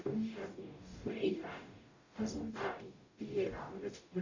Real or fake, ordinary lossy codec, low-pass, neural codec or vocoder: fake; Opus, 64 kbps; 7.2 kHz; codec, 44.1 kHz, 0.9 kbps, DAC